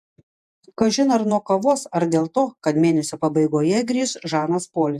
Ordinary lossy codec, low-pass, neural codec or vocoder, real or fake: AAC, 96 kbps; 14.4 kHz; vocoder, 48 kHz, 128 mel bands, Vocos; fake